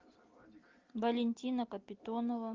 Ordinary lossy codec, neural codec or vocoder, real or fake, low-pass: Opus, 32 kbps; none; real; 7.2 kHz